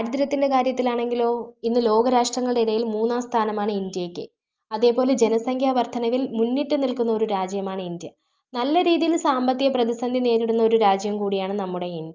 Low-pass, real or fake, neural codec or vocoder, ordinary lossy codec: 7.2 kHz; real; none; Opus, 24 kbps